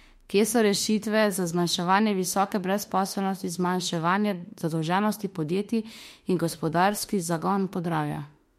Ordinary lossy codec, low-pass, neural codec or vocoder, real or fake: MP3, 64 kbps; 19.8 kHz; autoencoder, 48 kHz, 32 numbers a frame, DAC-VAE, trained on Japanese speech; fake